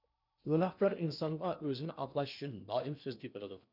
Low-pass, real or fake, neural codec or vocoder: 5.4 kHz; fake; codec, 16 kHz in and 24 kHz out, 0.8 kbps, FocalCodec, streaming, 65536 codes